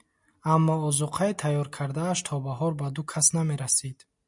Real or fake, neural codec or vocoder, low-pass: real; none; 10.8 kHz